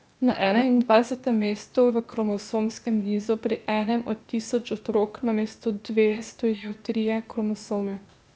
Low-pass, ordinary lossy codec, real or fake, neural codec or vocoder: none; none; fake; codec, 16 kHz, 0.8 kbps, ZipCodec